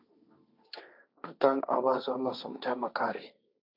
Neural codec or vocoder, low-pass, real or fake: codec, 16 kHz, 1.1 kbps, Voila-Tokenizer; 5.4 kHz; fake